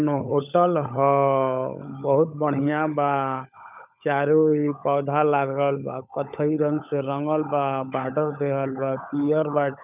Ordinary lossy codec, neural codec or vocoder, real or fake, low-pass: none; codec, 16 kHz, 16 kbps, FunCodec, trained on LibriTTS, 50 frames a second; fake; 3.6 kHz